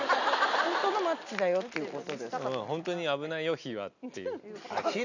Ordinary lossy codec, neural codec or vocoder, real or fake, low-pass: none; none; real; 7.2 kHz